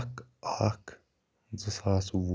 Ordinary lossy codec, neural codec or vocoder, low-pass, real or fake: none; none; none; real